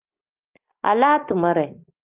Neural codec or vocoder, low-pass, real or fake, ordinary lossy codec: none; 3.6 kHz; real; Opus, 24 kbps